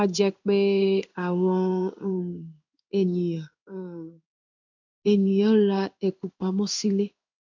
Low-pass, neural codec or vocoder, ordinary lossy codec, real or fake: 7.2 kHz; codec, 16 kHz in and 24 kHz out, 1 kbps, XY-Tokenizer; none; fake